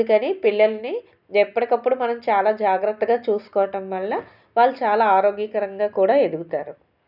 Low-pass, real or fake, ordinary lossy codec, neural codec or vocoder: 5.4 kHz; real; none; none